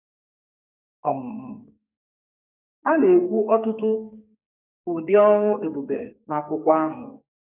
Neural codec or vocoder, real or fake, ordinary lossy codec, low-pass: codec, 44.1 kHz, 2.6 kbps, SNAC; fake; none; 3.6 kHz